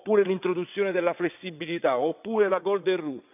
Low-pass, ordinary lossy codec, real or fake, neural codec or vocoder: 3.6 kHz; none; fake; vocoder, 22.05 kHz, 80 mel bands, WaveNeXt